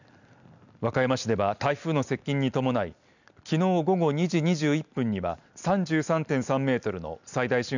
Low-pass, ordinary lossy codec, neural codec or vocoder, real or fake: 7.2 kHz; none; none; real